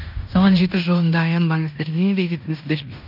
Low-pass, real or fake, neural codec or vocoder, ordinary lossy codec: 5.4 kHz; fake; codec, 16 kHz in and 24 kHz out, 0.9 kbps, LongCat-Audio-Codec, fine tuned four codebook decoder; none